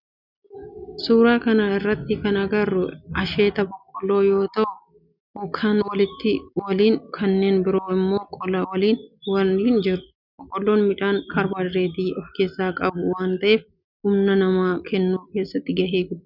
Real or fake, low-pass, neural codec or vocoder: real; 5.4 kHz; none